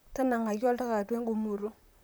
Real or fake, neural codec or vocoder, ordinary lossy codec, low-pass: fake; vocoder, 44.1 kHz, 128 mel bands, Pupu-Vocoder; none; none